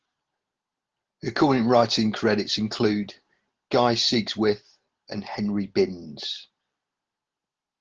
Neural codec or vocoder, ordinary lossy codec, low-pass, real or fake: none; Opus, 16 kbps; 7.2 kHz; real